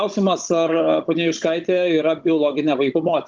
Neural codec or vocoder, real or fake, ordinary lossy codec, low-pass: codec, 16 kHz, 16 kbps, FunCodec, trained on Chinese and English, 50 frames a second; fake; Opus, 32 kbps; 7.2 kHz